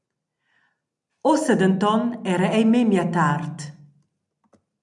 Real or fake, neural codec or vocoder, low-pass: real; none; 10.8 kHz